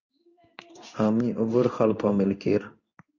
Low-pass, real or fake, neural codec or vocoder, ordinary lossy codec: 7.2 kHz; fake; codec, 16 kHz in and 24 kHz out, 1 kbps, XY-Tokenizer; Opus, 64 kbps